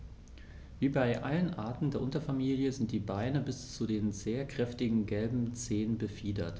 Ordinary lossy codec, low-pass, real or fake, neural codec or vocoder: none; none; real; none